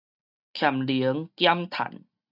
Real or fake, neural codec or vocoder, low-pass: real; none; 5.4 kHz